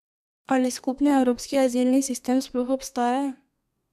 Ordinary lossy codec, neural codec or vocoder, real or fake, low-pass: none; codec, 32 kHz, 1.9 kbps, SNAC; fake; 14.4 kHz